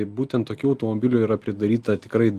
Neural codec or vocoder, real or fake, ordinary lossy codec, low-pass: none; real; Opus, 24 kbps; 14.4 kHz